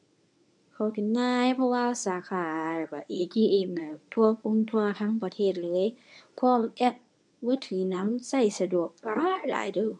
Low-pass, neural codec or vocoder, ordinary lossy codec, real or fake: 10.8 kHz; codec, 24 kHz, 0.9 kbps, WavTokenizer, medium speech release version 1; MP3, 96 kbps; fake